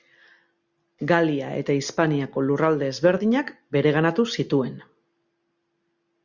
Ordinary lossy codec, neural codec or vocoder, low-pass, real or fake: Opus, 64 kbps; none; 7.2 kHz; real